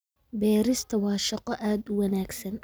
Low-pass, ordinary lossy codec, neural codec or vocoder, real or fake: none; none; none; real